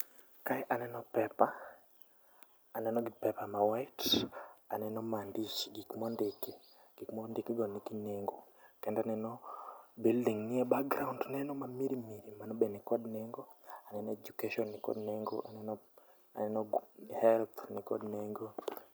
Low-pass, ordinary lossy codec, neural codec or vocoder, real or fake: none; none; none; real